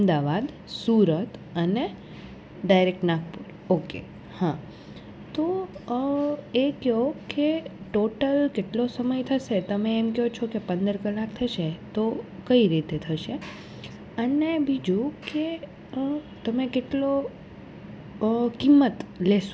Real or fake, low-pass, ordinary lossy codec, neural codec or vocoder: real; none; none; none